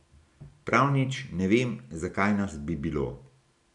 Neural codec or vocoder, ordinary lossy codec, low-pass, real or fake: none; none; 10.8 kHz; real